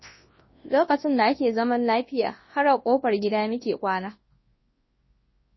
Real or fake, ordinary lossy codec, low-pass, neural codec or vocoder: fake; MP3, 24 kbps; 7.2 kHz; codec, 24 kHz, 0.9 kbps, WavTokenizer, large speech release